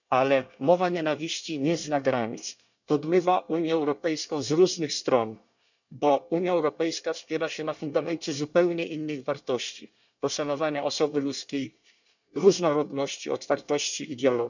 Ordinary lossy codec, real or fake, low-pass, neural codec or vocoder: none; fake; 7.2 kHz; codec, 24 kHz, 1 kbps, SNAC